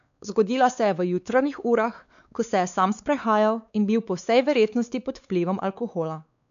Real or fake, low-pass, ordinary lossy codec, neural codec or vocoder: fake; 7.2 kHz; none; codec, 16 kHz, 4 kbps, X-Codec, WavLM features, trained on Multilingual LibriSpeech